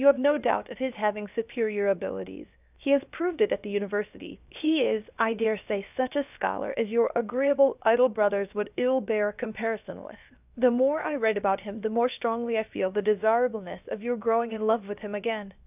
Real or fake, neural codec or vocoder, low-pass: fake; codec, 16 kHz, 0.7 kbps, FocalCodec; 3.6 kHz